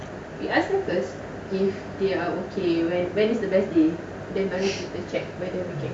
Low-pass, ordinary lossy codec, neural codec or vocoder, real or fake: none; none; none; real